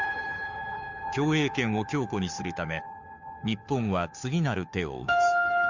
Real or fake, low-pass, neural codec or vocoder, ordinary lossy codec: fake; 7.2 kHz; codec, 16 kHz, 2 kbps, FunCodec, trained on Chinese and English, 25 frames a second; none